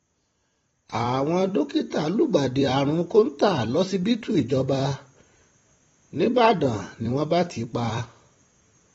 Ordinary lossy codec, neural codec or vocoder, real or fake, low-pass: AAC, 24 kbps; vocoder, 44.1 kHz, 128 mel bands every 256 samples, BigVGAN v2; fake; 19.8 kHz